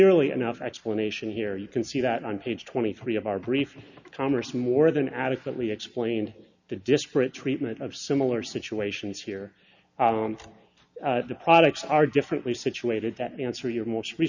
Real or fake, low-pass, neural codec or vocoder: real; 7.2 kHz; none